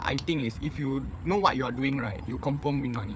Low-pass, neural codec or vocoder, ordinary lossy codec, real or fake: none; codec, 16 kHz, 4 kbps, FreqCodec, larger model; none; fake